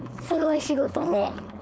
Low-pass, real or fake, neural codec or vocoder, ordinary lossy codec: none; fake; codec, 16 kHz, 4.8 kbps, FACodec; none